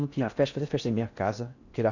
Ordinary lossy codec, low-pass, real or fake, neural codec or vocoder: none; 7.2 kHz; fake; codec, 16 kHz in and 24 kHz out, 0.6 kbps, FocalCodec, streaming, 4096 codes